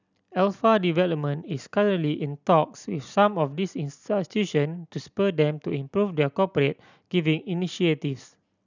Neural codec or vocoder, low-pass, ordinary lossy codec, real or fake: none; 7.2 kHz; none; real